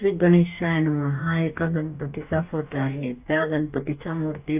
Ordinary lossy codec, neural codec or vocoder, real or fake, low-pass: AAC, 32 kbps; codec, 44.1 kHz, 2.6 kbps, DAC; fake; 3.6 kHz